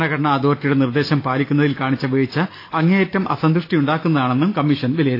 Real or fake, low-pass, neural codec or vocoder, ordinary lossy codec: real; 5.4 kHz; none; AAC, 32 kbps